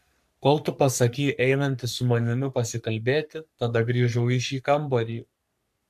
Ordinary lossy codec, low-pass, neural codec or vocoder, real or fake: AAC, 96 kbps; 14.4 kHz; codec, 44.1 kHz, 3.4 kbps, Pupu-Codec; fake